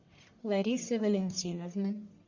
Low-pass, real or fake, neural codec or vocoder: 7.2 kHz; fake; codec, 44.1 kHz, 1.7 kbps, Pupu-Codec